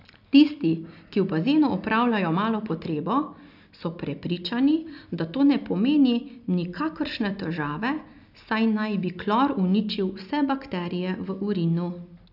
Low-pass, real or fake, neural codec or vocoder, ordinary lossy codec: 5.4 kHz; real; none; none